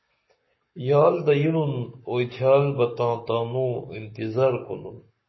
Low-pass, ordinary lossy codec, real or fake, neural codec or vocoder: 7.2 kHz; MP3, 24 kbps; fake; codec, 44.1 kHz, 7.8 kbps, Pupu-Codec